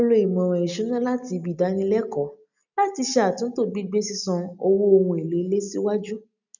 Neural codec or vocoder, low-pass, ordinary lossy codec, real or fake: none; 7.2 kHz; none; real